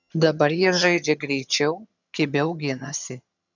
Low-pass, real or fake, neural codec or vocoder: 7.2 kHz; fake; vocoder, 22.05 kHz, 80 mel bands, HiFi-GAN